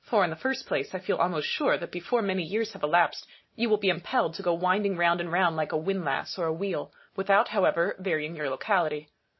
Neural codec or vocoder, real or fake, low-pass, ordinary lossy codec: none; real; 7.2 kHz; MP3, 24 kbps